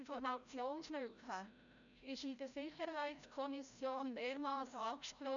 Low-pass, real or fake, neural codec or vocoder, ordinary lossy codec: 7.2 kHz; fake; codec, 16 kHz, 0.5 kbps, FreqCodec, larger model; none